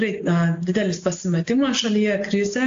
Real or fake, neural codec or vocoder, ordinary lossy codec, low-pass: real; none; AAC, 48 kbps; 7.2 kHz